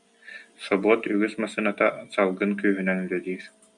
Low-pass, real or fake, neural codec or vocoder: 10.8 kHz; real; none